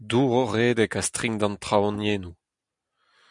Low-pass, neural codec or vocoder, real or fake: 10.8 kHz; none; real